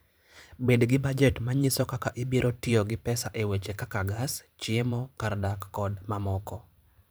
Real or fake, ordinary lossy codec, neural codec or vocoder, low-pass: fake; none; vocoder, 44.1 kHz, 128 mel bands every 512 samples, BigVGAN v2; none